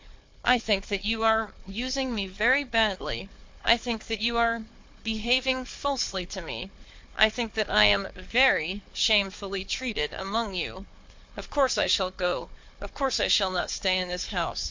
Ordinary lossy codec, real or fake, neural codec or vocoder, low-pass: MP3, 48 kbps; fake; codec, 16 kHz, 4 kbps, FunCodec, trained on Chinese and English, 50 frames a second; 7.2 kHz